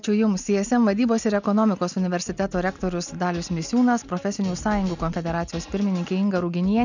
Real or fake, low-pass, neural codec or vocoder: real; 7.2 kHz; none